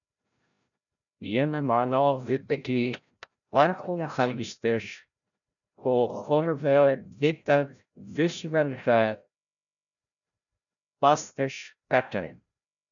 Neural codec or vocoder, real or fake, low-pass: codec, 16 kHz, 0.5 kbps, FreqCodec, larger model; fake; 7.2 kHz